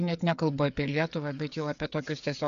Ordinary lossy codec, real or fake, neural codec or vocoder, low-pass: AAC, 96 kbps; fake; codec, 16 kHz, 8 kbps, FreqCodec, smaller model; 7.2 kHz